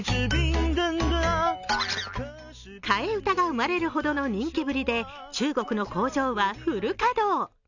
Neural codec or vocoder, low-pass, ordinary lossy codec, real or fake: none; 7.2 kHz; none; real